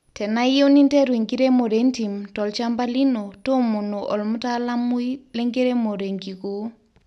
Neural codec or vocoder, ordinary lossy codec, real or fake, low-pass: none; none; real; none